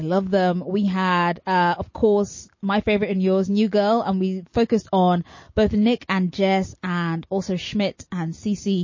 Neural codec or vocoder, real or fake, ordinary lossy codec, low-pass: none; real; MP3, 32 kbps; 7.2 kHz